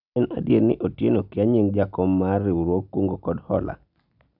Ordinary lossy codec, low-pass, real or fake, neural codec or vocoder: none; 5.4 kHz; real; none